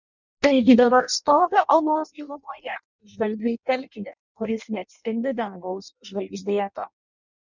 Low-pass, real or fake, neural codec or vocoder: 7.2 kHz; fake; codec, 16 kHz in and 24 kHz out, 0.6 kbps, FireRedTTS-2 codec